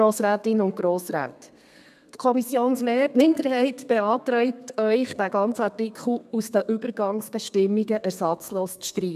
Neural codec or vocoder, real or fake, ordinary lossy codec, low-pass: codec, 32 kHz, 1.9 kbps, SNAC; fake; none; 14.4 kHz